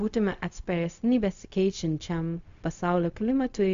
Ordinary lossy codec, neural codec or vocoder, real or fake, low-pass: MP3, 64 kbps; codec, 16 kHz, 0.4 kbps, LongCat-Audio-Codec; fake; 7.2 kHz